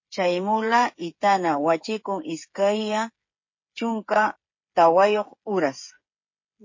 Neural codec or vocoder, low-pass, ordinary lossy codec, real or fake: codec, 16 kHz, 8 kbps, FreqCodec, smaller model; 7.2 kHz; MP3, 32 kbps; fake